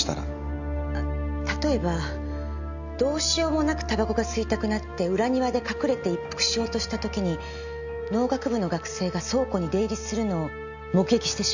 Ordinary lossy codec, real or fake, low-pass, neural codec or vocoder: none; real; 7.2 kHz; none